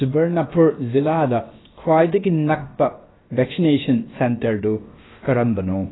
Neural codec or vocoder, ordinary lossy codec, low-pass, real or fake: codec, 16 kHz, about 1 kbps, DyCAST, with the encoder's durations; AAC, 16 kbps; 7.2 kHz; fake